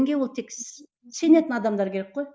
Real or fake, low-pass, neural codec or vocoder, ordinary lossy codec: real; none; none; none